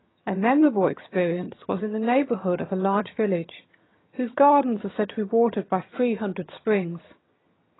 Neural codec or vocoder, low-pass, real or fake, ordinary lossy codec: vocoder, 22.05 kHz, 80 mel bands, HiFi-GAN; 7.2 kHz; fake; AAC, 16 kbps